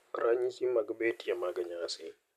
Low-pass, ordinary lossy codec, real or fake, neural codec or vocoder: 14.4 kHz; none; real; none